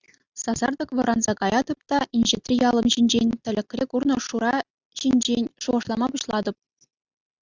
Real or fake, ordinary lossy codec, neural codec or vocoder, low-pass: real; Opus, 64 kbps; none; 7.2 kHz